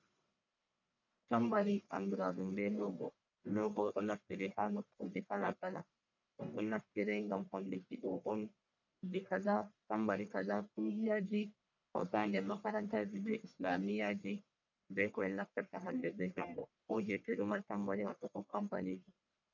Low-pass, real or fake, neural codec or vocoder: 7.2 kHz; fake; codec, 44.1 kHz, 1.7 kbps, Pupu-Codec